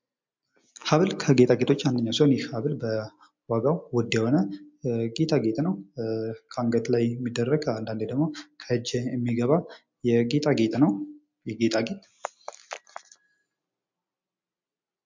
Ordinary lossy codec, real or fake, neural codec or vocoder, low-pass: MP3, 64 kbps; real; none; 7.2 kHz